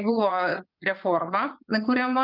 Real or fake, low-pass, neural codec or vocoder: fake; 5.4 kHz; vocoder, 22.05 kHz, 80 mel bands, Vocos